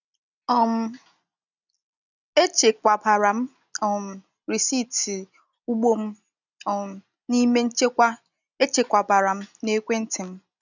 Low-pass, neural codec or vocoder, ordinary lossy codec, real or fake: 7.2 kHz; none; none; real